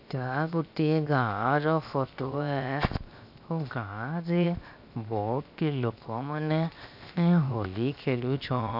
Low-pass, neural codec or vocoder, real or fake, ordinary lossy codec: 5.4 kHz; codec, 16 kHz, 0.7 kbps, FocalCodec; fake; none